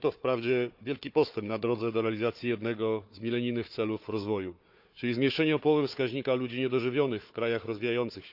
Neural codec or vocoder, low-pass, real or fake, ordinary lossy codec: codec, 16 kHz, 4 kbps, FunCodec, trained on Chinese and English, 50 frames a second; 5.4 kHz; fake; none